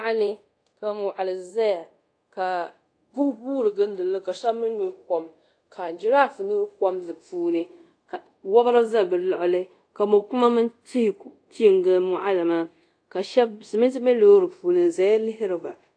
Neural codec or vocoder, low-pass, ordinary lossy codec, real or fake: codec, 24 kHz, 0.5 kbps, DualCodec; 9.9 kHz; AAC, 48 kbps; fake